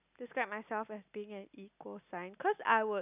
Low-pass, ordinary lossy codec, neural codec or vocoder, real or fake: 3.6 kHz; none; none; real